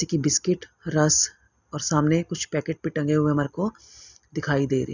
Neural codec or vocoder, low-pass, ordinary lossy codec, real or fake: none; 7.2 kHz; none; real